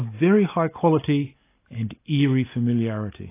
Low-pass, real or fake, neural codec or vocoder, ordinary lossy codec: 3.6 kHz; real; none; AAC, 24 kbps